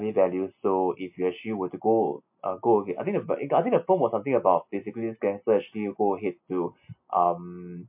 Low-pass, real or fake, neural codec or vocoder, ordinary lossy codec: 3.6 kHz; real; none; MP3, 32 kbps